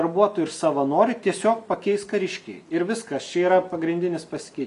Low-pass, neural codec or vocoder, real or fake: 10.8 kHz; none; real